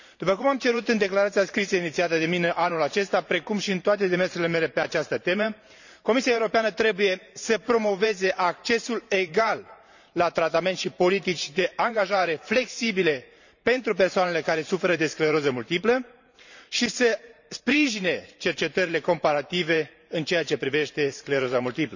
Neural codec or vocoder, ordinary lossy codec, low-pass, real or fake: vocoder, 44.1 kHz, 128 mel bands every 512 samples, BigVGAN v2; none; 7.2 kHz; fake